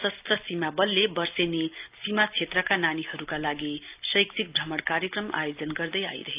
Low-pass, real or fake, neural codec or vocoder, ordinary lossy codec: 3.6 kHz; real; none; Opus, 32 kbps